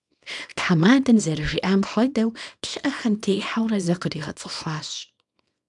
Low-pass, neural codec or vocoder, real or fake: 10.8 kHz; codec, 24 kHz, 0.9 kbps, WavTokenizer, small release; fake